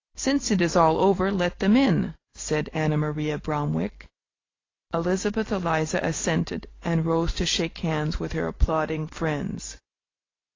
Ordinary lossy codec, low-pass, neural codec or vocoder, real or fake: AAC, 32 kbps; 7.2 kHz; none; real